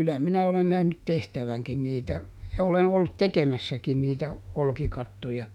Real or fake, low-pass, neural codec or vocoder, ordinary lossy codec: fake; 19.8 kHz; autoencoder, 48 kHz, 32 numbers a frame, DAC-VAE, trained on Japanese speech; none